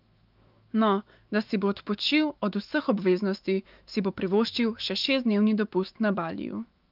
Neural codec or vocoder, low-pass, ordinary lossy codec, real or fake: autoencoder, 48 kHz, 128 numbers a frame, DAC-VAE, trained on Japanese speech; 5.4 kHz; Opus, 24 kbps; fake